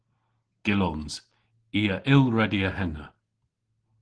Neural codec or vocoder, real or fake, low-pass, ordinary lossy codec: none; real; 9.9 kHz; Opus, 16 kbps